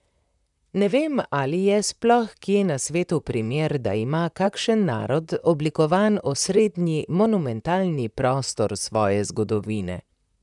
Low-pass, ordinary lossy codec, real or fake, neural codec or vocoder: 10.8 kHz; none; fake; vocoder, 44.1 kHz, 128 mel bands, Pupu-Vocoder